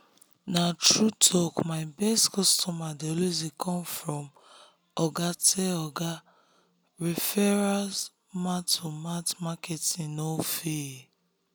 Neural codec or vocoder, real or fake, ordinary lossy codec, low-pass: none; real; none; none